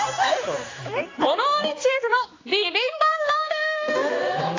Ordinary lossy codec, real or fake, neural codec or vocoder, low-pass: AAC, 32 kbps; fake; codec, 16 kHz, 2 kbps, X-Codec, HuBERT features, trained on general audio; 7.2 kHz